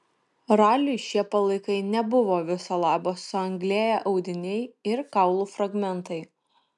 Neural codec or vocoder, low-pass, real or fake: none; 10.8 kHz; real